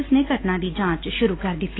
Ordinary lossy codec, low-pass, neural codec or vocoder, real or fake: AAC, 16 kbps; 7.2 kHz; none; real